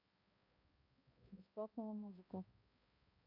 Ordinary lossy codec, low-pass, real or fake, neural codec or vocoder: MP3, 32 kbps; 5.4 kHz; fake; codec, 16 kHz, 0.5 kbps, X-Codec, HuBERT features, trained on balanced general audio